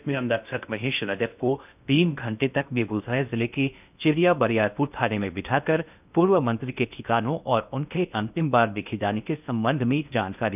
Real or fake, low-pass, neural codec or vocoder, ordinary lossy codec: fake; 3.6 kHz; codec, 16 kHz in and 24 kHz out, 0.6 kbps, FocalCodec, streaming, 2048 codes; none